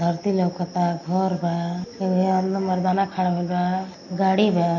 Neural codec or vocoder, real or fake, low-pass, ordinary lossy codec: none; real; 7.2 kHz; MP3, 32 kbps